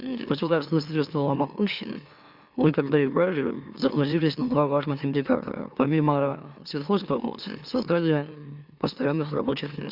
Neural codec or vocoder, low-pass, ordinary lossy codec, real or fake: autoencoder, 44.1 kHz, a latent of 192 numbers a frame, MeloTTS; 5.4 kHz; Opus, 64 kbps; fake